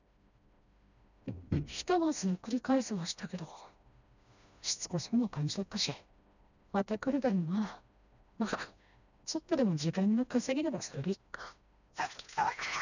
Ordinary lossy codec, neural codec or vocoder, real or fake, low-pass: none; codec, 16 kHz, 1 kbps, FreqCodec, smaller model; fake; 7.2 kHz